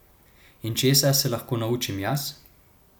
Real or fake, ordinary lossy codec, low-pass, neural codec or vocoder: real; none; none; none